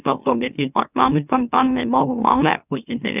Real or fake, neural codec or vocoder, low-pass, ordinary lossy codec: fake; autoencoder, 44.1 kHz, a latent of 192 numbers a frame, MeloTTS; 3.6 kHz; none